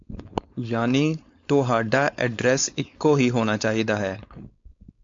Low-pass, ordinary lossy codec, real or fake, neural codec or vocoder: 7.2 kHz; AAC, 48 kbps; fake; codec, 16 kHz, 4.8 kbps, FACodec